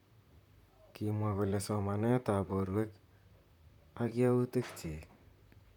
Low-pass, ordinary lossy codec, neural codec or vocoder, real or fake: 19.8 kHz; none; vocoder, 44.1 kHz, 128 mel bands, Pupu-Vocoder; fake